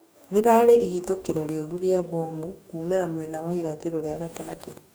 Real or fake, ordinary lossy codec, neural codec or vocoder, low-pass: fake; none; codec, 44.1 kHz, 2.6 kbps, DAC; none